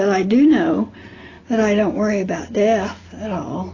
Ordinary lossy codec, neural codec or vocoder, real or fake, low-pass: AAC, 32 kbps; none; real; 7.2 kHz